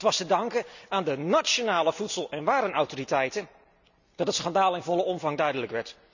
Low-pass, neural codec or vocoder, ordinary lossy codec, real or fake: 7.2 kHz; none; none; real